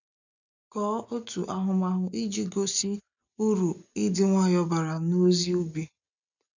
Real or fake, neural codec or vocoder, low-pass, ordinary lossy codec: real; none; 7.2 kHz; none